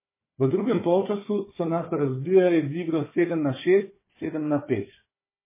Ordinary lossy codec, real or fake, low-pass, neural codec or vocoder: MP3, 16 kbps; fake; 3.6 kHz; codec, 16 kHz, 4 kbps, FunCodec, trained on Chinese and English, 50 frames a second